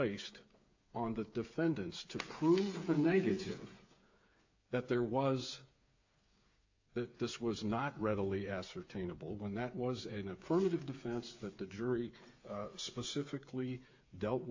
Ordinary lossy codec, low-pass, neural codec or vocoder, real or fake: AAC, 48 kbps; 7.2 kHz; codec, 16 kHz, 8 kbps, FreqCodec, smaller model; fake